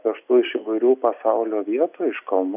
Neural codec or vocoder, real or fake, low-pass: none; real; 3.6 kHz